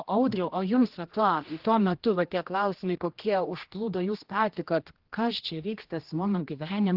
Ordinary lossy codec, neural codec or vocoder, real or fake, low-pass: Opus, 16 kbps; codec, 16 kHz, 1 kbps, X-Codec, HuBERT features, trained on general audio; fake; 5.4 kHz